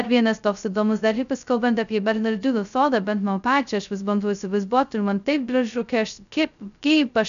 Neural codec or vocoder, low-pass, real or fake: codec, 16 kHz, 0.2 kbps, FocalCodec; 7.2 kHz; fake